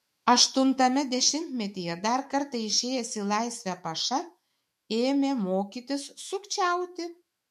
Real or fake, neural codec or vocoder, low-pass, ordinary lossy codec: fake; autoencoder, 48 kHz, 128 numbers a frame, DAC-VAE, trained on Japanese speech; 14.4 kHz; MP3, 64 kbps